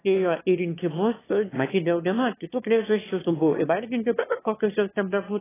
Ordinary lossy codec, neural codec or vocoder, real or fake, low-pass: AAC, 16 kbps; autoencoder, 22.05 kHz, a latent of 192 numbers a frame, VITS, trained on one speaker; fake; 3.6 kHz